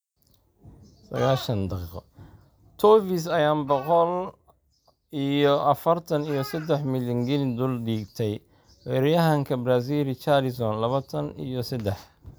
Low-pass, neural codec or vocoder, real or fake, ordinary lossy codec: none; none; real; none